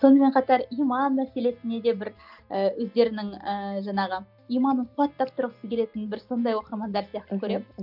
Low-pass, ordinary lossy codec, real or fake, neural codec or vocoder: 5.4 kHz; none; real; none